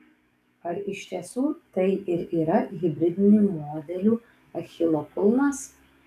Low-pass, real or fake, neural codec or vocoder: 14.4 kHz; fake; codec, 44.1 kHz, 7.8 kbps, DAC